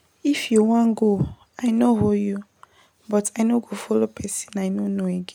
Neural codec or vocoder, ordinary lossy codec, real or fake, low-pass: none; MP3, 96 kbps; real; 19.8 kHz